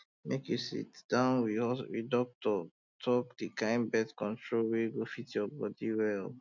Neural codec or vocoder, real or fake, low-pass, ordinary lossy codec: none; real; 7.2 kHz; none